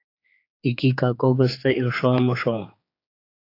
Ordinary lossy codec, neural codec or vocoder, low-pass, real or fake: AAC, 32 kbps; codec, 16 kHz, 4 kbps, X-Codec, HuBERT features, trained on general audio; 5.4 kHz; fake